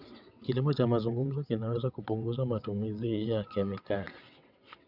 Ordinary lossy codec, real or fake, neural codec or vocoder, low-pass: none; fake; vocoder, 22.05 kHz, 80 mel bands, WaveNeXt; 5.4 kHz